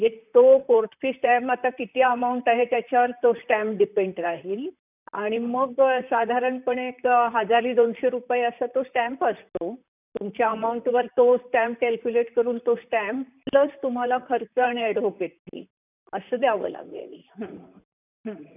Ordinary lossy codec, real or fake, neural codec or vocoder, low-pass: none; fake; vocoder, 44.1 kHz, 128 mel bands, Pupu-Vocoder; 3.6 kHz